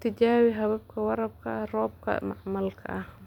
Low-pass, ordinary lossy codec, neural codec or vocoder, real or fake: 19.8 kHz; none; vocoder, 44.1 kHz, 128 mel bands every 256 samples, BigVGAN v2; fake